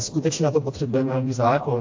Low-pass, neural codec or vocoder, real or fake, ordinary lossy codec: 7.2 kHz; codec, 16 kHz, 1 kbps, FreqCodec, smaller model; fake; AAC, 48 kbps